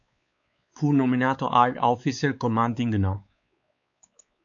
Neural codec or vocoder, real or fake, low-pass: codec, 16 kHz, 4 kbps, X-Codec, WavLM features, trained on Multilingual LibriSpeech; fake; 7.2 kHz